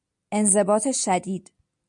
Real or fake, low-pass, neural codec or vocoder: real; 10.8 kHz; none